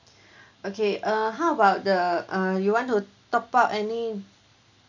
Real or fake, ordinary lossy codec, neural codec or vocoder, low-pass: real; none; none; 7.2 kHz